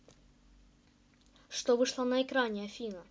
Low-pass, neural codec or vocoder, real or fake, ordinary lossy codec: none; none; real; none